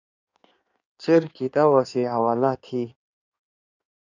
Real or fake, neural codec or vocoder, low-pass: fake; codec, 16 kHz in and 24 kHz out, 1.1 kbps, FireRedTTS-2 codec; 7.2 kHz